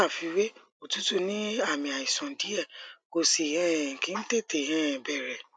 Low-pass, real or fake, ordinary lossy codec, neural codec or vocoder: none; real; none; none